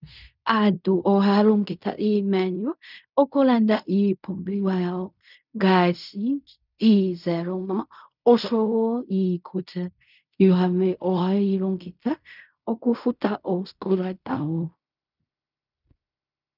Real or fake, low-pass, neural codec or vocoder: fake; 5.4 kHz; codec, 16 kHz in and 24 kHz out, 0.4 kbps, LongCat-Audio-Codec, fine tuned four codebook decoder